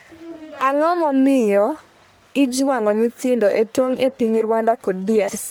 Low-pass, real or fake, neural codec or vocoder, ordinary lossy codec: none; fake; codec, 44.1 kHz, 1.7 kbps, Pupu-Codec; none